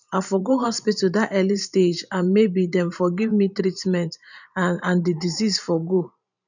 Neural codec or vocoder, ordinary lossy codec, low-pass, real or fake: vocoder, 44.1 kHz, 128 mel bands every 512 samples, BigVGAN v2; none; 7.2 kHz; fake